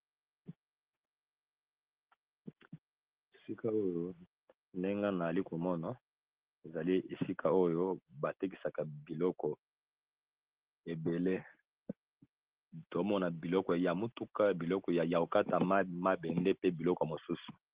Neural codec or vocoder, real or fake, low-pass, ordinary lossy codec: none; real; 3.6 kHz; Opus, 16 kbps